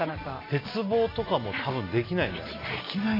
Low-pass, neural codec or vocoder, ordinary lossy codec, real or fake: 5.4 kHz; none; AAC, 24 kbps; real